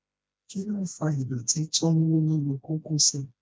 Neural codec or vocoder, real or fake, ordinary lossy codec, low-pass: codec, 16 kHz, 1 kbps, FreqCodec, smaller model; fake; none; none